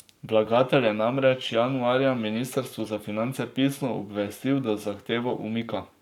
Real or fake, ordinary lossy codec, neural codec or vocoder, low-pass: fake; none; codec, 44.1 kHz, 7.8 kbps, Pupu-Codec; 19.8 kHz